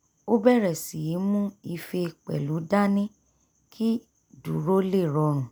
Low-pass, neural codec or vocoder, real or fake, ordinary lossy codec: none; none; real; none